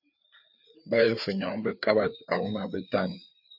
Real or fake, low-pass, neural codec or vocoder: fake; 5.4 kHz; codec, 16 kHz, 4 kbps, FreqCodec, larger model